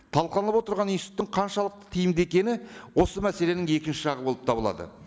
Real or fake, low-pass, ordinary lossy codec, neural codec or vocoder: real; none; none; none